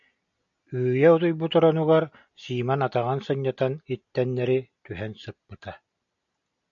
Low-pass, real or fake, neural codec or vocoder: 7.2 kHz; real; none